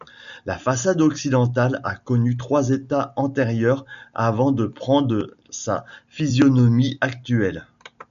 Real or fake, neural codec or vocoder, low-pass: real; none; 7.2 kHz